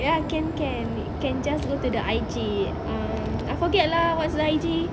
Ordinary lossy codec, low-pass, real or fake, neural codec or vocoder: none; none; real; none